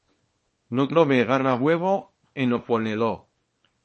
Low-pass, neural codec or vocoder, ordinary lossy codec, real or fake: 10.8 kHz; codec, 24 kHz, 0.9 kbps, WavTokenizer, small release; MP3, 32 kbps; fake